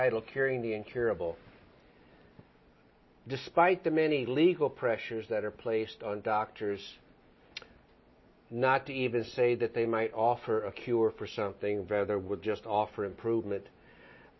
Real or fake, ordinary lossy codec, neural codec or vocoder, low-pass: real; MP3, 24 kbps; none; 7.2 kHz